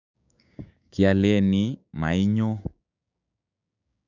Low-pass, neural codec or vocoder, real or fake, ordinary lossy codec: 7.2 kHz; codec, 16 kHz, 6 kbps, DAC; fake; none